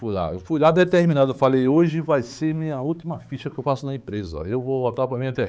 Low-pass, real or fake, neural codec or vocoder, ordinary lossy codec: none; fake; codec, 16 kHz, 4 kbps, X-Codec, HuBERT features, trained on balanced general audio; none